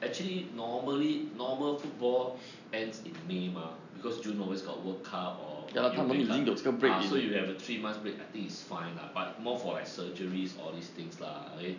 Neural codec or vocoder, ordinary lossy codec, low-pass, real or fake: none; none; 7.2 kHz; real